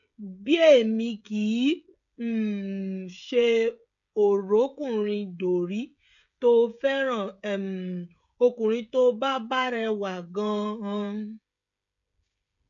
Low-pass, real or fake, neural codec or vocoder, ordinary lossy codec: 7.2 kHz; fake; codec, 16 kHz, 16 kbps, FreqCodec, smaller model; none